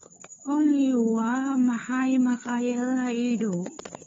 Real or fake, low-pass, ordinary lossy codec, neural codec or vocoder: fake; 7.2 kHz; AAC, 24 kbps; codec, 16 kHz, 4 kbps, FunCodec, trained on LibriTTS, 50 frames a second